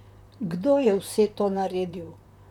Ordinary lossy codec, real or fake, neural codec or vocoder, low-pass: none; fake; vocoder, 44.1 kHz, 128 mel bands, Pupu-Vocoder; 19.8 kHz